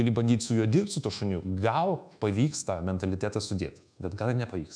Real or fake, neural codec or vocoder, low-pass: fake; codec, 24 kHz, 1.2 kbps, DualCodec; 9.9 kHz